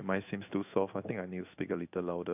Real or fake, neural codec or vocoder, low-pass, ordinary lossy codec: fake; codec, 16 kHz in and 24 kHz out, 1 kbps, XY-Tokenizer; 3.6 kHz; AAC, 32 kbps